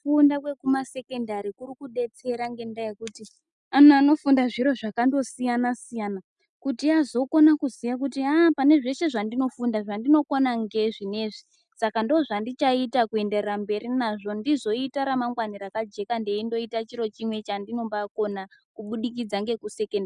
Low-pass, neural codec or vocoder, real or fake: 10.8 kHz; none; real